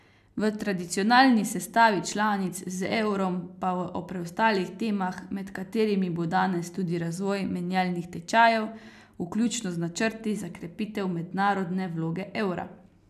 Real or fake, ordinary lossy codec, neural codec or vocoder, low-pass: real; none; none; 14.4 kHz